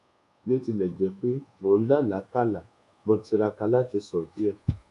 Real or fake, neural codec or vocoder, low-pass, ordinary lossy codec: fake; codec, 24 kHz, 1.2 kbps, DualCodec; 10.8 kHz; none